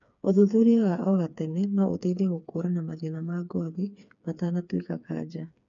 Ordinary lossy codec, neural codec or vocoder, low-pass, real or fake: none; codec, 16 kHz, 4 kbps, FreqCodec, smaller model; 7.2 kHz; fake